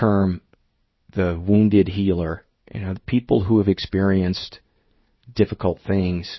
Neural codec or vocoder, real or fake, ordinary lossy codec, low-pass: codec, 16 kHz in and 24 kHz out, 1 kbps, XY-Tokenizer; fake; MP3, 24 kbps; 7.2 kHz